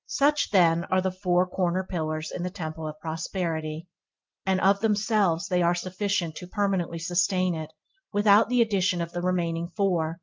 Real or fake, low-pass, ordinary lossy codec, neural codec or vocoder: real; 7.2 kHz; Opus, 32 kbps; none